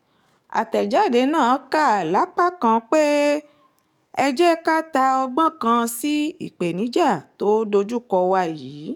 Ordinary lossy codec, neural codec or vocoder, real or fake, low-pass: none; codec, 44.1 kHz, 7.8 kbps, DAC; fake; 19.8 kHz